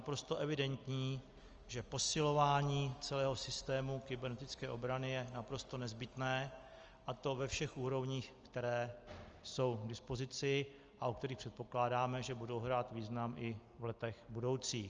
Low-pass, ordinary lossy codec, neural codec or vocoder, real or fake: 7.2 kHz; Opus, 24 kbps; none; real